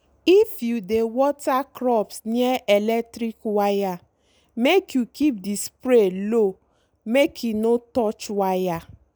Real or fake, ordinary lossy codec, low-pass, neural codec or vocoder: real; none; none; none